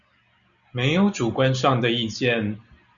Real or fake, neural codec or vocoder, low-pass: real; none; 7.2 kHz